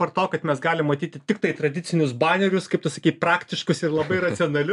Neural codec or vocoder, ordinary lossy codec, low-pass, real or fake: none; AAC, 96 kbps; 10.8 kHz; real